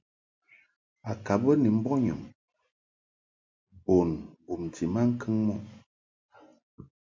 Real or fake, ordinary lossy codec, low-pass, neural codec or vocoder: real; MP3, 64 kbps; 7.2 kHz; none